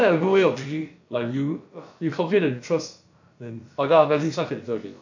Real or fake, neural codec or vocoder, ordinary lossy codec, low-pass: fake; codec, 16 kHz, about 1 kbps, DyCAST, with the encoder's durations; AAC, 48 kbps; 7.2 kHz